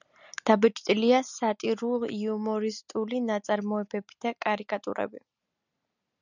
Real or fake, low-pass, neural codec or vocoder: real; 7.2 kHz; none